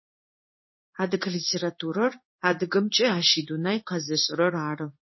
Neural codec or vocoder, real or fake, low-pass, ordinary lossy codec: codec, 16 kHz in and 24 kHz out, 1 kbps, XY-Tokenizer; fake; 7.2 kHz; MP3, 24 kbps